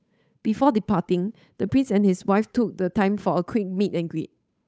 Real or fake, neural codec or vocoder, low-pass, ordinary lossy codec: fake; codec, 16 kHz, 8 kbps, FunCodec, trained on Chinese and English, 25 frames a second; none; none